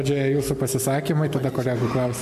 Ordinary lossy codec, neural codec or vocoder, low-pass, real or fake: MP3, 64 kbps; none; 14.4 kHz; real